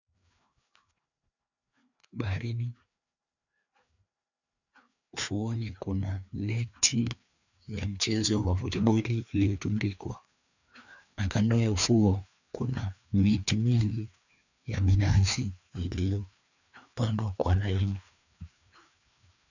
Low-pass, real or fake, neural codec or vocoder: 7.2 kHz; fake; codec, 16 kHz, 2 kbps, FreqCodec, larger model